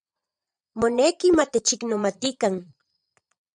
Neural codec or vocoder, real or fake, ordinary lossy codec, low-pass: vocoder, 22.05 kHz, 80 mel bands, Vocos; fake; MP3, 96 kbps; 9.9 kHz